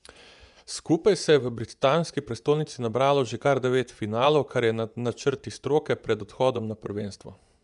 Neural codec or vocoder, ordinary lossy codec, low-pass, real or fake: vocoder, 24 kHz, 100 mel bands, Vocos; none; 10.8 kHz; fake